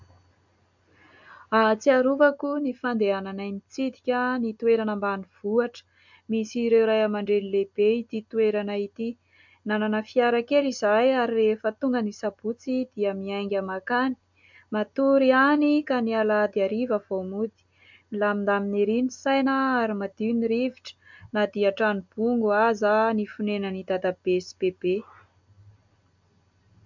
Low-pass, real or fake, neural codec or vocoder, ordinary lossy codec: 7.2 kHz; real; none; MP3, 64 kbps